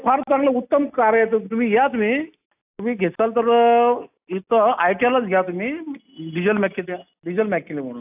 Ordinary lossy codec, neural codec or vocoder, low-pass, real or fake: AAC, 32 kbps; none; 3.6 kHz; real